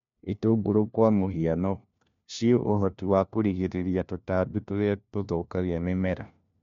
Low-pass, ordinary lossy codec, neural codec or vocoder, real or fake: 7.2 kHz; MP3, 64 kbps; codec, 16 kHz, 1 kbps, FunCodec, trained on LibriTTS, 50 frames a second; fake